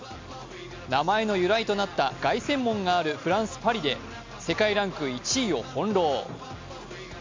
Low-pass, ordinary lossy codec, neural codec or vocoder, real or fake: 7.2 kHz; MP3, 64 kbps; none; real